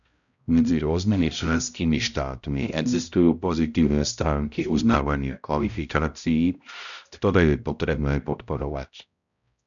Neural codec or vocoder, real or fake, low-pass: codec, 16 kHz, 0.5 kbps, X-Codec, HuBERT features, trained on balanced general audio; fake; 7.2 kHz